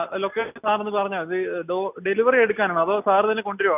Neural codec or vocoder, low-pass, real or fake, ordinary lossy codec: none; 3.6 kHz; real; none